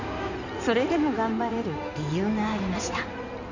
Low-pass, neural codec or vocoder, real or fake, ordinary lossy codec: 7.2 kHz; codec, 16 kHz in and 24 kHz out, 2.2 kbps, FireRedTTS-2 codec; fake; none